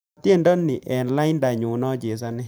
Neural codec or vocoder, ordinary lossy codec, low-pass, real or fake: vocoder, 44.1 kHz, 128 mel bands every 512 samples, BigVGAN v2; none; none; fake